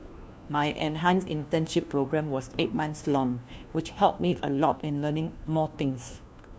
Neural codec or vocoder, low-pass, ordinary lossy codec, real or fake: codec, 16 kHz, 1 kbps, FunCodec, trained on LibriTTS, 50 frames a second; none; none; fake